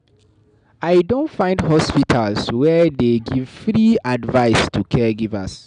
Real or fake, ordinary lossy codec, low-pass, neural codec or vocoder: real; none; 9.9 kHz; none